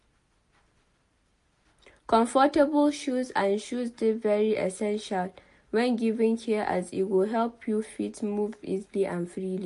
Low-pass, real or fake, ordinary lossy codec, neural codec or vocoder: 10.8 kHz; real; MP3, 48 kbps; none